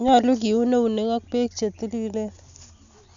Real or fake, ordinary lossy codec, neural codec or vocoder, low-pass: real; none; none; 7.2 kHz